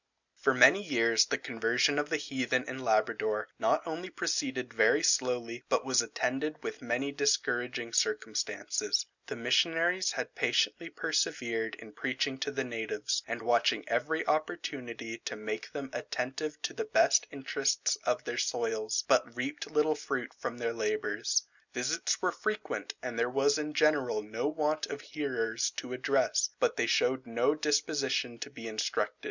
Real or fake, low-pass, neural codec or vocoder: real; 7.2 kHz; none